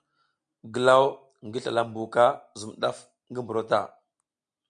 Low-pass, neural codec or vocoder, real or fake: 9.9 kHz; none; real